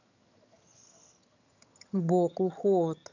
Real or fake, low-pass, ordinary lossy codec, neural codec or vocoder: fake; 7.2 kHz; none; vocoder, 22.05 kHz, 80 mel bands, HiFi-GAN